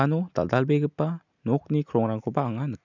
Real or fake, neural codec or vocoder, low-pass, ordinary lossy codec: real; none; 7.2 kHz; none